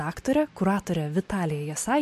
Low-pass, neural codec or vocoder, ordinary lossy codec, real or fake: 14.4 kHz; none; MP3, 64 kbps; real